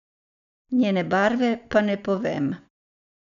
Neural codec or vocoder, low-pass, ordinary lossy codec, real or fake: none; 7.2 kHz; none; real